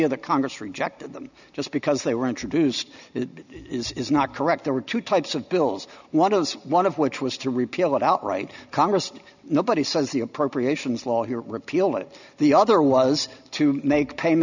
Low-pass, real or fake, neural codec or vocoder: 7.2 kHz; real; none